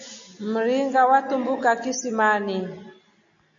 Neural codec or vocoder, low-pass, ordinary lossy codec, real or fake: none; 7.2 kHz; AAC, 64 kbps; real